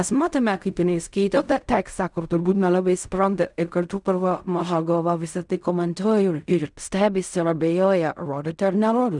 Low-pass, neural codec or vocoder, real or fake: 10.8 kHz; codec, 16 kHz in and 24 kHz out, 0.4 kbps, LongCat-Audio-Codec, fine tuned four codebook decoder; fake